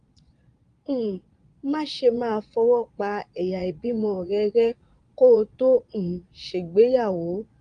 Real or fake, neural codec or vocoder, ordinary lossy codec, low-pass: fake; vocoder, 22.05 kHz, 80 mel bands, Vocos; Opus, 32 kbps; 9.9 kHz